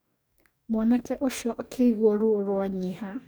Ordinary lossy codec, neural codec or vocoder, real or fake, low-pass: none; codec, 44.1 kHz, 2.6 kbps, DAC; fake; none